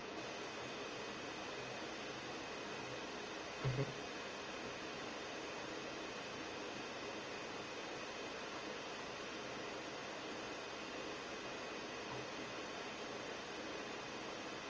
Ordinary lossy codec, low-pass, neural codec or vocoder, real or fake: Opus, 24 kbps; 7.2 kHz; none; real